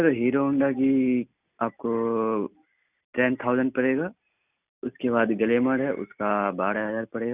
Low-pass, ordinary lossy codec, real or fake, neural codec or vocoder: 3.6 kHz; none; real; none